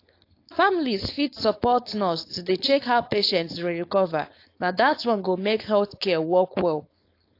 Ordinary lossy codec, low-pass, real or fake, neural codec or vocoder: AAC, 32 kbps; 5.4 kHz; fake; codec, 16 kHz, 4.8 kbps, FACodec